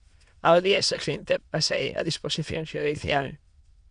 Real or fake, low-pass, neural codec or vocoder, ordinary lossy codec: fake; 9.9 kHz; autoencoder, 22.05 kHz, a latent of 192 numbers a frame, VITS, trained on many speakers; Opus, 64 kbps